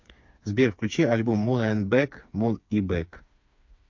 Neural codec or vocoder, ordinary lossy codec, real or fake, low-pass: codec, 16 kHz, 4 kbps, FreqCodec, smaller model; MP3, 48 kbps; fake; 7.2 kHz